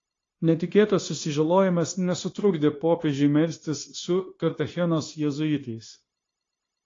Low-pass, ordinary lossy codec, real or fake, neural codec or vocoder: 7.2 kHz; AAC, 32 kbps; fake; codec, 16 kHz, 0.9 kbps, LongCat-Audio-Codec